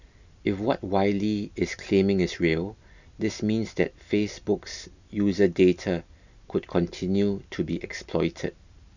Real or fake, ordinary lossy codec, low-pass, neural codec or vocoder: real; none; 7.2 kHz; none